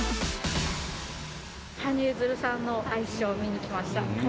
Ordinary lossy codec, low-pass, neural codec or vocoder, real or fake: none; none; none; real